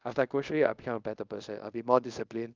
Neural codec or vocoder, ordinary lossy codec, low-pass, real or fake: codec, 24 kHz, 0.5 kbps, DualCodec; Opus, 24 kbps; 7.2 kHz; fake